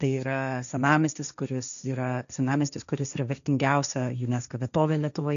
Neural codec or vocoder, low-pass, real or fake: codec, 16 kHz, 1.1 kbps, Voila-Tokenizer; 7.2 kHz; fake